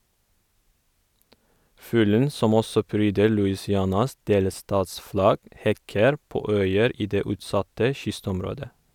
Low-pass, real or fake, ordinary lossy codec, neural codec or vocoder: 19.8 kHz; real; none; none